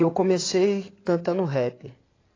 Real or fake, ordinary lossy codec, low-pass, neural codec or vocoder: fake; AAC, 32 kbps; 7.2 kHz; codec, 16 kHz in and 24 kHz out, 2.2 kbps, FireRedTTS-2 codec